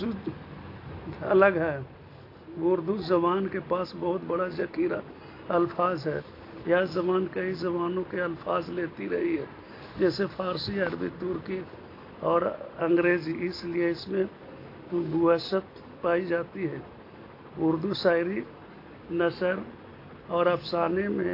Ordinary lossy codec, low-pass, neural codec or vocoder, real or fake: AAC, 32 kbps; 5.4 kHz; none; real